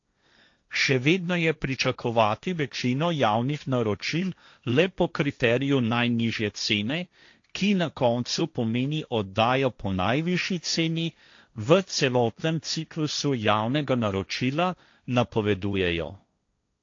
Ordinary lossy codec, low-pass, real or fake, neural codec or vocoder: AAC, 48 kbps; 7.2 kHz; fake; codec, 16 kHz, 1.1 kbps, Voila-Tokenizer